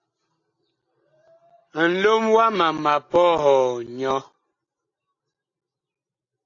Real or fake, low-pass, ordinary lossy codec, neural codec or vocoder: real; 7.2 kHz; AAC, 48 kbps; none